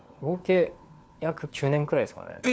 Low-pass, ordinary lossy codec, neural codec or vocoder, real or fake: none; none; codec, 16 kHz, 4 kbps, FunCodec, trained on LibriTTS, 50 frames a second; fake